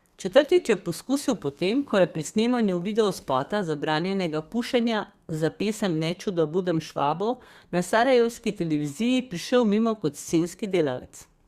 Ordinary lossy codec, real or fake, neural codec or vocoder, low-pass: Opus, 64 kbps; fake; codec, 32 kHz, 1.9 kbps, SNAC; 14.4 kHz